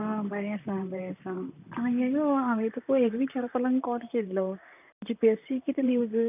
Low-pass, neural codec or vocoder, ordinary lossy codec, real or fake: 3.6 kHz; vocoder, 44.1 kHz, 128 mel bands, Pupu-Vocoder; none; fake